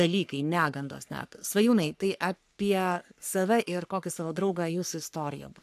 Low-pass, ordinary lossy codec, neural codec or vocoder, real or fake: 14.4 kHz; AAC, 96 kbps; codec, 44.1 kHz, 3.4 kbps, Pupu-Codec; fake